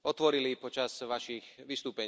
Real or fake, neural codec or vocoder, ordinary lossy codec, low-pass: real; none; none; none